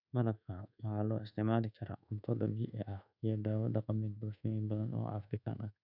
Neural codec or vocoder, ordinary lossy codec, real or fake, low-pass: codec, 24 kHz, 1.2 kbps, DualCodec; none; fake; 5.4 kHz